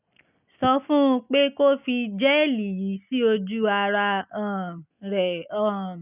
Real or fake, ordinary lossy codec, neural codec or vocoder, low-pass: real; none; none; 3.6 kHz